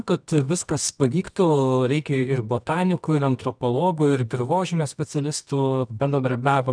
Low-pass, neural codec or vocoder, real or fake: 9.9 kHz; codec, 24 kHz, 0.9 kbps, WavTokenizer, medium music audio release; fake